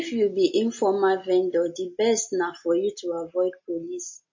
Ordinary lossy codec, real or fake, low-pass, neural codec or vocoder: MP3, 32 kbps; real; 7.2 kHz; none